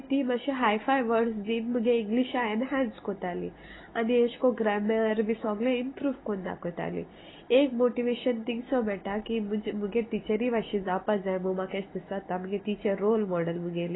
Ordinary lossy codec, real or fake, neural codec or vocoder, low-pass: AAC, 16 kbps; real; none; 7.2 kHz